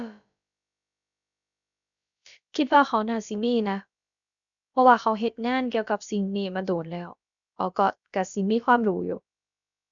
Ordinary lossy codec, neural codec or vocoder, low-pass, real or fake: none; codec, 16 kHz, about 1 kbps, DyCAST, with the encoder's durations; 7.2 kHz; fake